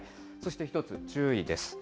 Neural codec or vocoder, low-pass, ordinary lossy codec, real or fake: none; none; none; real